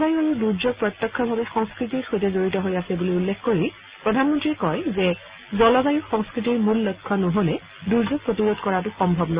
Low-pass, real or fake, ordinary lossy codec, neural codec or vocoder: 3.6 kHz; real; Opus, 64 kbps; none